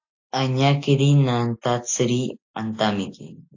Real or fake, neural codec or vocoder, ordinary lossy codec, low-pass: real; none; AAC, 48 kbps; 7.2 kHz